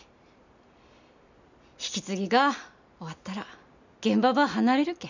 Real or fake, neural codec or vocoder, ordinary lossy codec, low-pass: real; none; none; 7.2 kHz